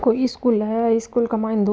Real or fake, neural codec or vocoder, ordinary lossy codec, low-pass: real; none; none; none